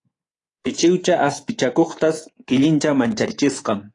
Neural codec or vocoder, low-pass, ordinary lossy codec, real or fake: codec, 24 kHz, 3.1 kbps, DualCodec; 10.8 kHz; AAC, 32 kbps; fake